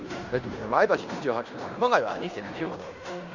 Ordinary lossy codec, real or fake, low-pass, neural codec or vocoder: none; fake; 7.2 kHz; codec, 16 kHz in and 24 kHz out, 0.9 kbps, LongCat-Audio-Codec, fine tuned four codebook decoder